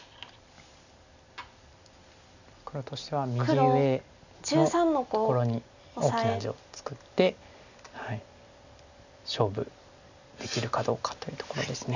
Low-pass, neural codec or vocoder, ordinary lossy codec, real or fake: 7.2 kHz; none; none; real